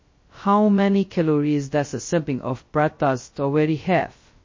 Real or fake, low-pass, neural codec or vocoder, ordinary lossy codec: fake; 7.2 kHz; codec, 16 kHz, 0.2 kbps, FocalCodec; MP3, 32 kbps